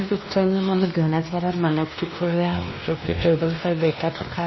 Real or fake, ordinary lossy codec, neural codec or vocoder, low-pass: fake; MP3, 24 kbps; codec, 16 kHz, 1 kbps, X-Codec, WavLM features, trained on Multilingual LibriSpeech; 7.2 kHz